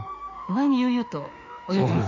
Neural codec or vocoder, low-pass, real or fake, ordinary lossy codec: autoencoder, 48 kHz, 32 numbers a frame, DAC-VAE, trained on Japanese speech; 7.2 kHz; fake; none